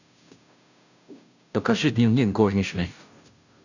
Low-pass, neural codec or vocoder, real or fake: 7.2 kHz; codec, 16 kHz, 0.5 kbps, FunCodec, trained on Chinese and English, 25 frames a second; fake